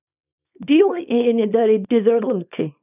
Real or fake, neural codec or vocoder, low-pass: fake; codec, 24 kHz, 0.9 kbps, WavTokenizer, small release; 3.6 kHz